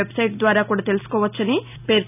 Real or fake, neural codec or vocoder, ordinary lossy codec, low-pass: real; none; none; 3.6 kHz